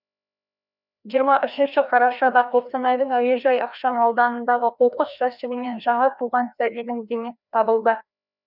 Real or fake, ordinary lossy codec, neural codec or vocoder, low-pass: fake; none; codec, 16 kHz, 1 kbps, FreqCodec, larger model; 5.4 kHz